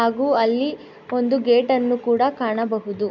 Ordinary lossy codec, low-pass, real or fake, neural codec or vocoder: none; 7.2 kHz; real; none